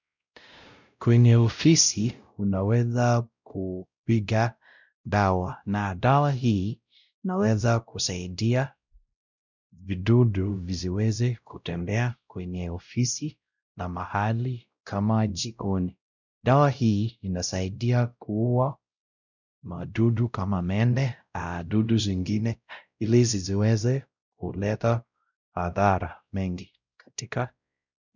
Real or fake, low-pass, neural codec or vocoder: fake; 7.2 kHz; codec, 16 kHz, 0.5 kbps, X-Codec, WavLM features, trained on Multilingual LibriSpeech